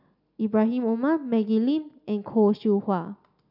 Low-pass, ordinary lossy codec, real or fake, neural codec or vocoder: 5.4 kHz; none; real; none